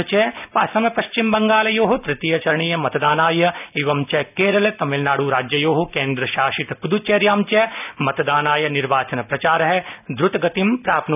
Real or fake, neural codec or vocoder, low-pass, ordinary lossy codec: real; none; 3.6 kHz; none